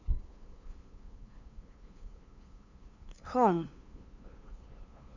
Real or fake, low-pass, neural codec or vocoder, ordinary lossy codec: fake; 7.2 kHz; codec, 16 kHz, 2 kbps, FunCodec, trained on LibriTTS, 25 frames a second; none